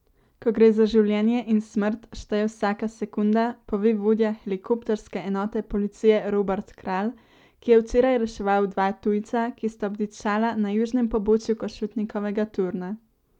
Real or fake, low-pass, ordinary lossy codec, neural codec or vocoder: real; 19.8 kHz; none; none